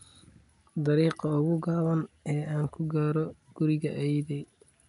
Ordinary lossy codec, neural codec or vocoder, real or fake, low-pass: none; none; real; 10.8 kHz